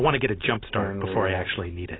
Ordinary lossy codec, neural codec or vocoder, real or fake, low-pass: AAC, 16 kbps; none; real; 7.2 kHz